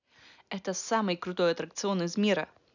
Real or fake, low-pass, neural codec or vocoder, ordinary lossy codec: real; 7.2 kHz; none; none